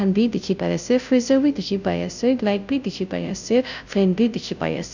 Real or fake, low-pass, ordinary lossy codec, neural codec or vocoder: fake; 7.2 kHz; none; codec, 16 kHz, 0.5 kbps, FunCodec, trained on Chinese and English, 25 frames a second